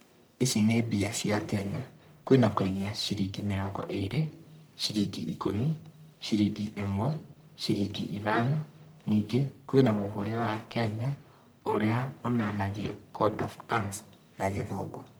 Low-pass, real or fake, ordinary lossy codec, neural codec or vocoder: none; fake; none; codec, 44.1 kHz, 1.7 kbps, Pupu-Codec